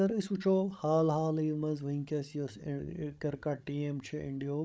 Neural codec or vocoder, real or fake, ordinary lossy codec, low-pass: codec, 16 kHz, 16 kbps, FreqCodec, larger model; fake; none; none